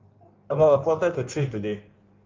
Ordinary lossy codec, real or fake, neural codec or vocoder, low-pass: Opus, 24 kbps; fake; codec, 16 kHz in and 24 kHz out, 1.1 kbps, FireRedTTS-2 codec; 7.2 kHz